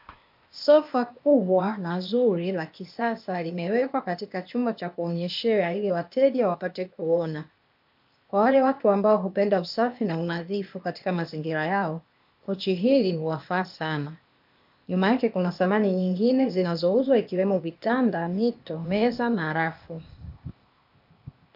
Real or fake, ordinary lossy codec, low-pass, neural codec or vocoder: fake; MP3, 48 kbps; 5.4 kHz; codec, 16 kHz, 0.8 kbps, ZipCodec